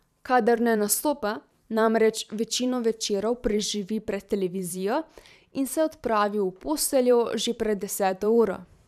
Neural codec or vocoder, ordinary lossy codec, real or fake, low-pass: vocoder, 44.1 kHz, 128 mel bands every 512 samples, BigVGAN v2; none; fake; 14.4 kHz